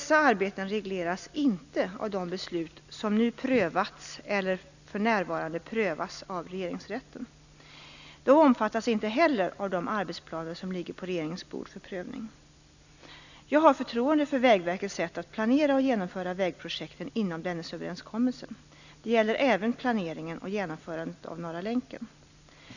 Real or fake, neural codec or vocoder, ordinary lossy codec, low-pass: real; none; none; 7.2 kHz